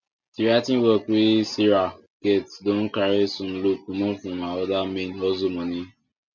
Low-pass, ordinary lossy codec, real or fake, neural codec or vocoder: 7.2 kHz; none; real; none